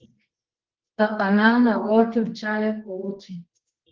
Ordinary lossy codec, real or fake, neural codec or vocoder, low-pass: Opus, 16 kbps; fake; codec, 24 kHz, 0.9 kbps, WavTokenizer, medium music audio release; 7.2 kHz